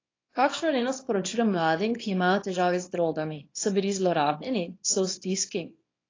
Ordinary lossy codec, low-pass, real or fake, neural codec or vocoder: AAC, 32 kbps; 7.2 kHz; fake; codec, 24 kHz, 0.9 kbps, WavTokenizer, small release